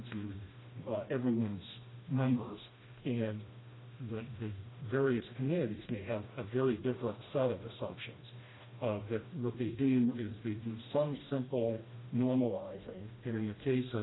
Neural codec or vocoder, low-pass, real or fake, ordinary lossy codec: codec, 16 kHz, 1 kbps, FreqCodec, smaller model; 7.2 kHz; fake; AAC, 16 kbps